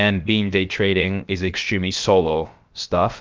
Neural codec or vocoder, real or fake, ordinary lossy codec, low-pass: codec, 16 kHz, about 1 kbps, DyCAST, with the encoder's durations; fake; Opus, 24 kbps; 7.2 kHz